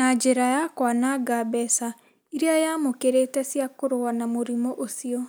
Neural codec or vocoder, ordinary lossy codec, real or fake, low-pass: none; none; real; none